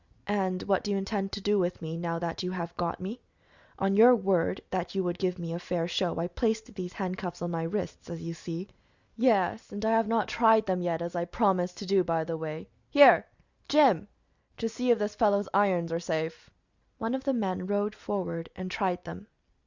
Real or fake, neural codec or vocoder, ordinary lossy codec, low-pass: real; none; Opus, 64 kbps; 7.2 kHz